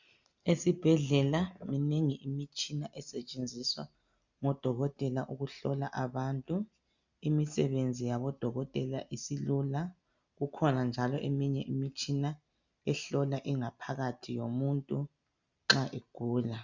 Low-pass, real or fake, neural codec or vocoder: 7.2 kHz; real; none